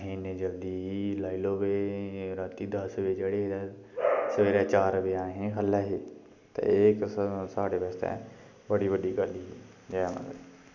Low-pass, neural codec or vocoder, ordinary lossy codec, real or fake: 7.2 kHz; none; none; real